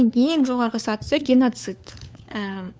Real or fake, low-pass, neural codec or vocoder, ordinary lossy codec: fake; none; codec, 16 kHz, 4 kbps, FunCodec, trained on LibriTTS, 50 frames a second; none